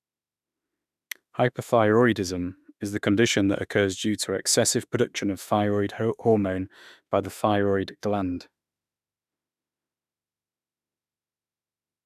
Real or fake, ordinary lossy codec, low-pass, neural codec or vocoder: fake; none; 14.4 kHz; autoencoder, 48 kHz, 32 numbers a frame, DAC-VAE, trained on Japanese speech